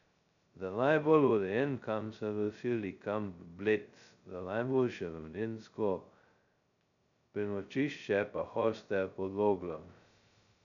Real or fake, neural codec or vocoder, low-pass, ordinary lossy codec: fake; codec, 16 kHz, 0.2 kbps, FocalCodec; 7.2 kHz; MP3, 96 kbps